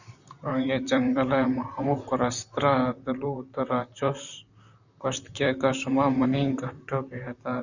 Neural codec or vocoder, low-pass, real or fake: vocoder, 44.1 kHz, 128 mel bands, Pupu-Vocoder; 7.2 kHz; fake